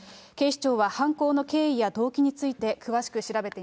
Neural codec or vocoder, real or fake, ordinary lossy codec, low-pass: none; real; none; none